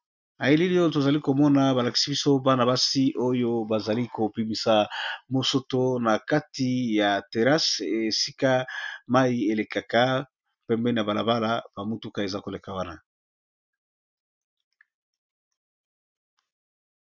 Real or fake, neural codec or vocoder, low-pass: real; none; 7.2 kHz